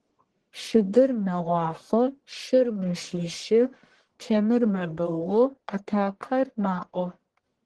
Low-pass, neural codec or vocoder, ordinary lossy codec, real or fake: 10.8 kHz; codec, 44.1 kHz, 1.7 kbps, Pupu-Codec; Opus, 16 kbps; fake